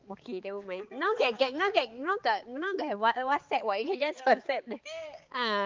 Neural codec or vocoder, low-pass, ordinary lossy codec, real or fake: codec, 16 kHz, 4 kbps, X-Codec, HuBERT features, trained on balanced general audio; 7.2 kHz; Opus, 32 kbps; fake